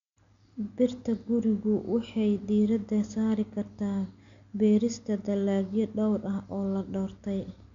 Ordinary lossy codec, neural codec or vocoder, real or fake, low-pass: MP3, 64 kbps; none; real; 7.2 kHz